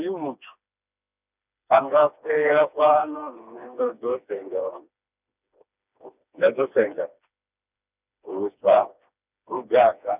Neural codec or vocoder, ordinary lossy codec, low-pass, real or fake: codec, 16 kHz, 2 kbps, FreqCodec, smaller model; none; 3.6 kHz; fake